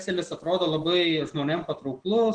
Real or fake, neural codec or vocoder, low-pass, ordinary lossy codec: real; none; 9.9 kHz; Opus, 16 kbps